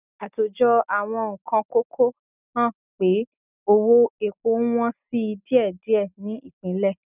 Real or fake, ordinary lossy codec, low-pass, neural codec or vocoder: real; none; 3.6 kHz; none